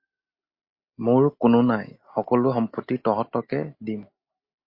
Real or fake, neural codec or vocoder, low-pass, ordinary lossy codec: real; none; 5.4 kHz; MP3, 32 kbps